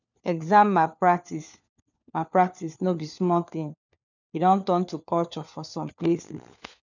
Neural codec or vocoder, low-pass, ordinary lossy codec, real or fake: codec, 16 kHz, 4 kbps, FunCodec, trained on LibriTTS, 50 frames a second; 7.2 kHz; none; fake